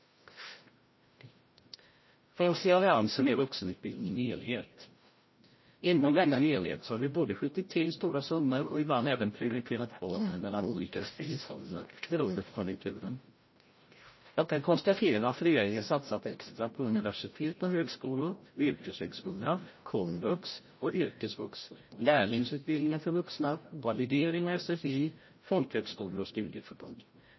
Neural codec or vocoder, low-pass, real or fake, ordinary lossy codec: codec, 16 kHz, 0.5 kbps, FreqCodec, larger model; 7.2 kHz; fake; MP3, 24 kbps